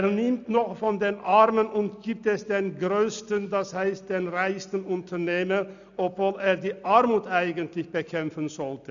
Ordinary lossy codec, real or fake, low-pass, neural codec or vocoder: none; real; 7.2 kHz; none